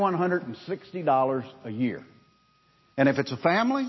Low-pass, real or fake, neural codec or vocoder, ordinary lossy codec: 7.2 kHz; real; none; MP3, 24 kbps